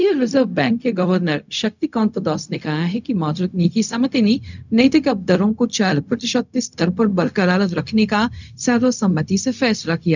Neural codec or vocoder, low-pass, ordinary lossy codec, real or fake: codec, 16 kHz, 0.4 kbps, LongCat-Audio-Codec; 7.2 kHz; none; fake